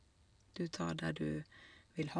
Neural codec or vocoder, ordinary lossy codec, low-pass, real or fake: none; none; 9.9 kHz; real